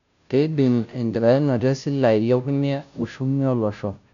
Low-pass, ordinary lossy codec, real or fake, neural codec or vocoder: 7.2 kHz; none; fake; codec, 16 kHz, 0.5 kbps, FunCodec, trained on Chinese and English, 25 frames a second